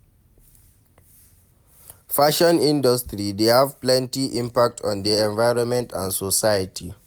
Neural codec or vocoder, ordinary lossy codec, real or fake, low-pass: none; none; real; none